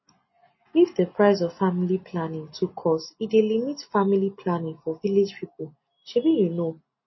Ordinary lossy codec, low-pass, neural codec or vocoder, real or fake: MP3, 24 kbps; 7.2 kHz; none; real